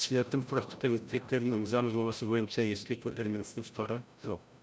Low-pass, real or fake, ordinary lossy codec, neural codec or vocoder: none; fake; none; codec, 16 kHz, 0.5 kbps, FreqCodec, larger model